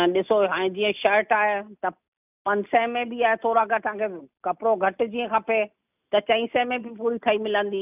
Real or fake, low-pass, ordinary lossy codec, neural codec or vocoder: real; 3.6 kHz; none; none